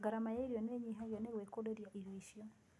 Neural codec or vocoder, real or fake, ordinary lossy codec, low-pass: none; real; none; none